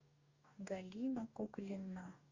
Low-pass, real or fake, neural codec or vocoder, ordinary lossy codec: 7.2 kHz; fake; codec, 44.1 kHz, 2.6 kbps, DAC; Opus, 64 kbps